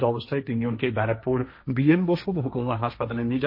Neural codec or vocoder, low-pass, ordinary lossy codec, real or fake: codec, 16 kHz, 1.1 kbps, Voila-Tokenizer; 5.4 kHz; MP3, 32 kbps; fake